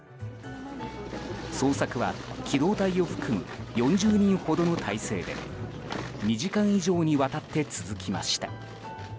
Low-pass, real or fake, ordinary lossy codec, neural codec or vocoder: none; real; none; none